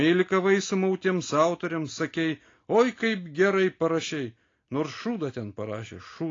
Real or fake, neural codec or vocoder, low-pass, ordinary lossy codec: real; none; 7.2 kHz; AAC, 32 kbps